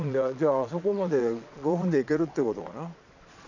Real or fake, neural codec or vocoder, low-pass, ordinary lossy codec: fake; vocoder, 22.05 kHz, 80 mel bands, Vocos; 7.2 kHz; none